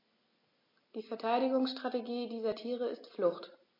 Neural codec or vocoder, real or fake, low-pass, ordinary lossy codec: none; real; 5.4 kHz; MP3, 32 kbps